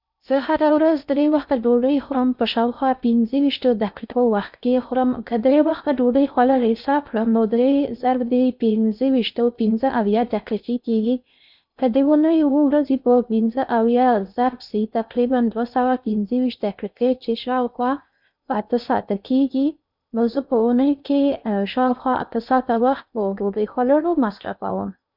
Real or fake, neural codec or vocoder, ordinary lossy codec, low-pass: fake; codec, 16 kHz in and 24 kHz out, 0.6 kbps, FocalCodec, streaming, 2048 codes; none; 5.4 kHz